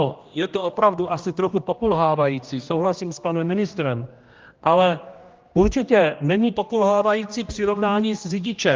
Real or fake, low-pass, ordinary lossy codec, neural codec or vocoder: fake; 7.2 kHz; Opus, 32 kbps; codec, 16 kHz, 1 kbps, X-Codec, HuBERT features, trained on general audio